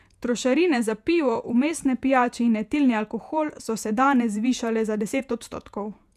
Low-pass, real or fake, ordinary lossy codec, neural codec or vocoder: 14.4 kHz; fake; none; vocoder, 48 kHz, 128 mel bands, Vocos